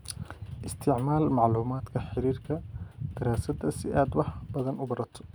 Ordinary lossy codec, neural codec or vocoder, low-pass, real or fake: none; none; none; real